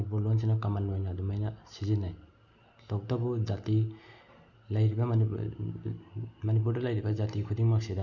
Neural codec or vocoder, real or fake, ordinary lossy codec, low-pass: none; real; none; 7.2 kHz